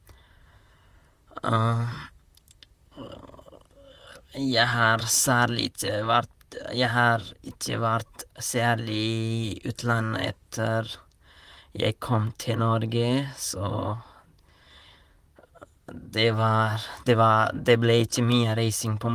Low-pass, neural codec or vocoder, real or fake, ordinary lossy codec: 14.4 kHz; vocoder, 44.1 kHz, 128 mel bands, Pupu-Vocoder; fake; Opus, 32 kbps